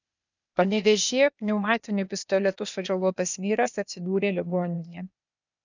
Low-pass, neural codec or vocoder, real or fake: 7.2 kHz; codec, 16 kHz, 0.8 kbps, ZipCodec; fake